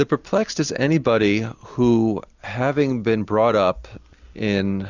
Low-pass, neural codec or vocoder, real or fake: 7.2 kHz; none; real